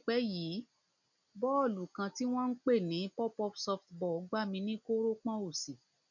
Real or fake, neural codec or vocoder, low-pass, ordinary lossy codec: real; none; 7.2 kHz; none